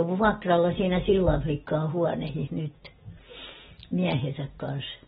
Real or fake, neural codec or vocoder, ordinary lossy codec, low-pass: real; none; AAC, 16 kbps; 7.2 kHz